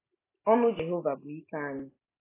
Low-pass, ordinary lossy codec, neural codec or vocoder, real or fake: 3.6 kHz; AAC, 16 kbps; none; real